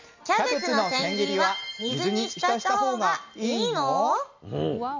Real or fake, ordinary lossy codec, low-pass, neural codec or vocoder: real; MP3, 64 kbps; 7.2 kHz; none